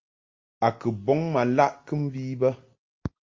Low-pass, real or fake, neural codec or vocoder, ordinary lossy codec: 7.2 kHz; real; none; Opus, 32 kbps